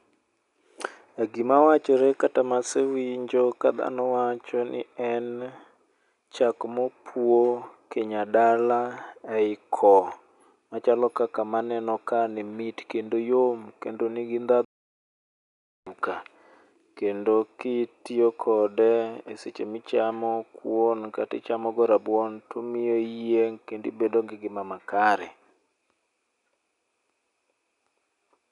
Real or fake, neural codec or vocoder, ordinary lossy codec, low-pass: real; none; none; 10.8 kHz